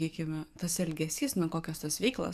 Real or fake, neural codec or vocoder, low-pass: fake; codec, 44.1 kHz, 7.8 kbps, DAC; 14.4 kHz